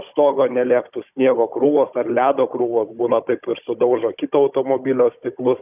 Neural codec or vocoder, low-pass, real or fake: codec, 16 kHz, 16 kbps, FunCodec, trained on Chinese and English, 50 frames a second; 3.6 kHz; fake